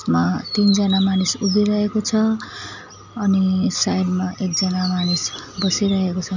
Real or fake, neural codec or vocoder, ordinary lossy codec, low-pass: real; none; none; 7.2 kHz